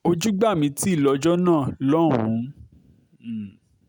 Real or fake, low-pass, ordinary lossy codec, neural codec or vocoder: real; 19.8 kHz; none; none